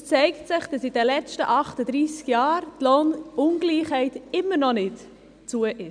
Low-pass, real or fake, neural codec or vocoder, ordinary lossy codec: 9.9 kHz; real; none; none